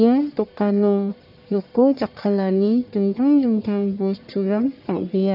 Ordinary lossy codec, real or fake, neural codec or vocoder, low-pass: none; fake; codec, 44.1 kHz, 1.7 kbps, Pupu-Codec; 5.4 kHz